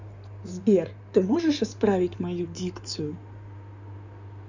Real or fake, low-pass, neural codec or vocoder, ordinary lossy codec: fake; 7.2 kHz; codec, 16 kHz in and 24 kHz out, 2.2 kbps, FireRedTTS-2 codec; none